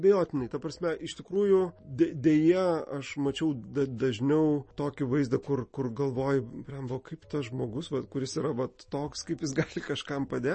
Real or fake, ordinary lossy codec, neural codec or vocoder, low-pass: real; MP3, 32 kbps; none; 10.8 kHz